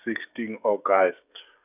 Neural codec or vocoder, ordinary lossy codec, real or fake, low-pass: codec, 16 kHz, 16 kbps, FreqCodec, smaller model; none; fake; 3.6 kHz